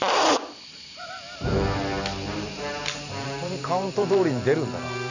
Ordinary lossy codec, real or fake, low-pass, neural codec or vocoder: none; fake; 7.2 kHz; vocoder, 44.1 kHz, 128 mel bands every 512 samples, BigVGAN v2